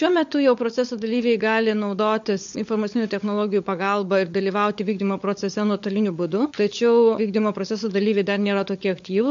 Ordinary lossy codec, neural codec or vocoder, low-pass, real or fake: MP3, 48 kbps; codec, 16 kHz, 16 kbps, FunCodec, trained on LibriTTS, 50 frames a second; 7.2 kHz; fake